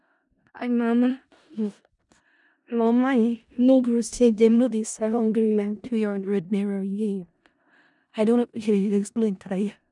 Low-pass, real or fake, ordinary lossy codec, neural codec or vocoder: 10.8 kHz; fake; none; codec, 16 kHz in and 24 kHz out, 0.4 kbps, LongCat-Audio-Codec, four codebook decoder